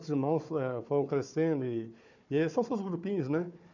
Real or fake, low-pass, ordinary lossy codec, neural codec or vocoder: fake; 7.2 kHz; none; codec, 16 kHz, 4 kbps, FunCodec, trained on Chinese and English, 50 frames a second